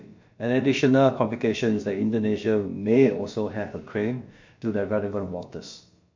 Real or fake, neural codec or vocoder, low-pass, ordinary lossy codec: fake; codec, 16 kHz, about 1 kbps, DyCAST, with the encoder's durations; 7.2 kHz; MP3, 48 kbps